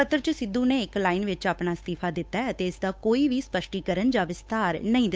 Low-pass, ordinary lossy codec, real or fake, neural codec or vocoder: none; none; fake; codec, 16 kHz, 8 kbps, FunCodec, trained on Chinese and English, 25 frames a second